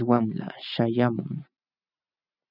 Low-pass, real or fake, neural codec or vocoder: 5.4 kHz; real; none